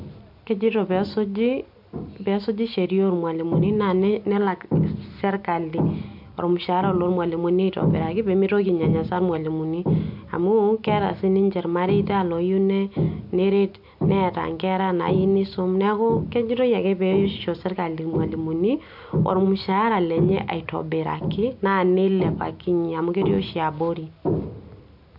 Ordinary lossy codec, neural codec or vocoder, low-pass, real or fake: MP3, 48 kbps; none; 5.4 kHz; real